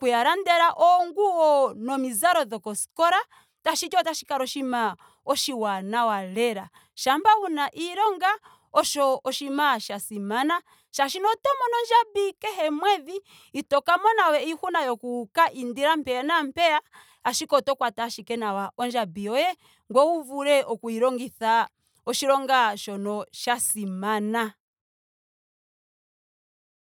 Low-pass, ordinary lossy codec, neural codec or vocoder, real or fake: none; none; none; real